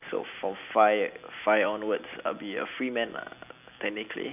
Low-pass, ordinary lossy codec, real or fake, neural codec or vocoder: 3.6 kHz; none; real; none